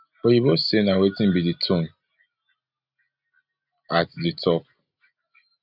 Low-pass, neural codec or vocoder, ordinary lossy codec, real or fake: 5.4 kHz; none; none; real